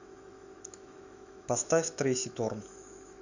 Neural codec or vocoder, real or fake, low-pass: autoencoder, 48 kHz, 128 numbers a frame, DAC-VAE, trained on Japanese speech; fake; 7.2 kHz